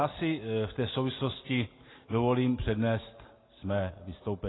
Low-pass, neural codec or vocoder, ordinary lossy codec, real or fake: 7.2 kHz; none; AAC, 16 kbps; real